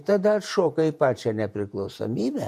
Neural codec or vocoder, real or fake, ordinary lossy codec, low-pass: vocoder, 48 kHz, 128 mel bands, Vocos; fake; MP3, 64 kbps; 14.4 kHz